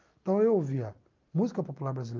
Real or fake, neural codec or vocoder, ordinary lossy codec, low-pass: real; none; Opus, 24 kbps; 7.2 kHz